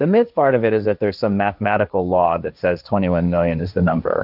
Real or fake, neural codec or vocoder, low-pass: fake; codec, 16 kHz, 1.1 kbps, Voila-Tokenizer; 5.4 kHz